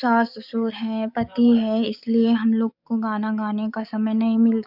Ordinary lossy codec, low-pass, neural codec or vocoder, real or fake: none; 5.4 kHz; codec, 44.1 kHz, 7.8 kbps, DAC; fake